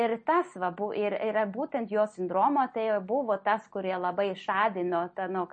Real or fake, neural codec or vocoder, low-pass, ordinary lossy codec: real; none; 10.8 kHz; MP3, 32 kbps